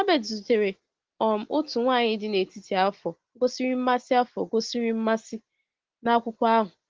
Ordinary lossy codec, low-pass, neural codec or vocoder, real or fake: Opus, 16 kbps; 7.2 kHz; none; real